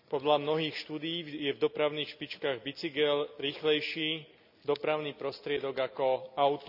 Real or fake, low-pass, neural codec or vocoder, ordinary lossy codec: real; 5.4 kHz; none; none